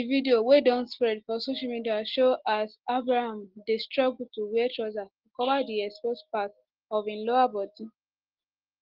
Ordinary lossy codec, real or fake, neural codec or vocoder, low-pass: Opus, 16 kbps; real; none; 5.4 kHz